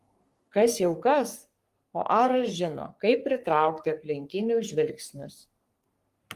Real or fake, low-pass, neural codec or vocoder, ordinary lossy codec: fake; 14.4 kHz; codec, 44.1 kHz, 3.4 kbps, Pupu-Codec; Opus, 32 kbps